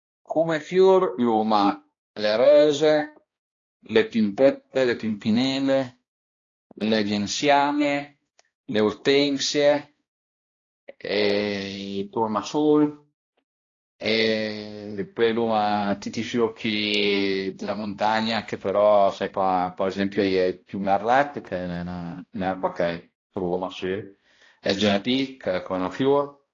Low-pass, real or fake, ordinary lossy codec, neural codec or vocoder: 7.2 kHz; fake; AAC, 32 kbps; codec, 16 kHz, 1 kbps, X-Codec, HuBERT features, trained on balanced general audio